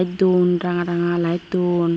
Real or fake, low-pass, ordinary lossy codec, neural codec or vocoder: real; none; none; none